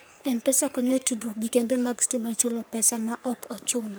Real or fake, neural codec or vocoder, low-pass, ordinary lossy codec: fake; codec, 44.1 kHz, 3.4 kbps, Pupu-Codec; none; none